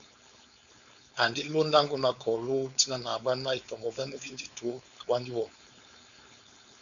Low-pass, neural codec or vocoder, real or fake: 7.2 kHz; codec, 16 kHz, 4.8 kbps, FACodec; fake